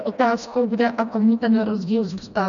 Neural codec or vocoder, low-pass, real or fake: codec, 16 kHz, 1 kbps, FreqCodec, smaller model; 7.2 kHz; fake